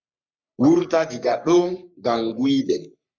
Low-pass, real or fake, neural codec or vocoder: 7.2 kHz; fake; codec, 44.1 kHz, 3.4 kbps, Pupu-Codec